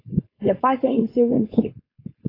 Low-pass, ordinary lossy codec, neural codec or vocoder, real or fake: 5.4 kHz; AAC, 24 kbps; codec, 16 kHz, 4.8 kbps, FACodec; fake